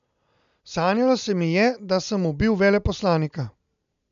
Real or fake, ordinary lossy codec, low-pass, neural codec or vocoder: real; MP3, 96 kbps; 7.2 kHz; none